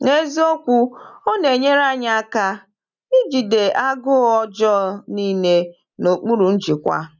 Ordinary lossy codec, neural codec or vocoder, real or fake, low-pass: none; none; real; 7.2 kHz